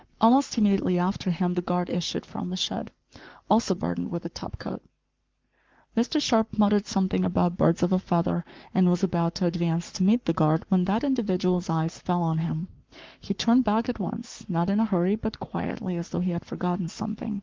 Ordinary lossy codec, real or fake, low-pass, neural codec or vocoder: Opus, 32 kbps; fake; 7.2 kHz; codec, 44.1 kHz, 7.8 kbps, Pupu-Codec